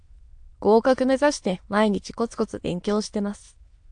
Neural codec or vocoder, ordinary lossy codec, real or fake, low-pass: autoencoder, 22.05 kHz, a latent of 192 numbers a frame, VITS, trained on many speakers; AAC, 64 kbps; fake; 9.9 kHz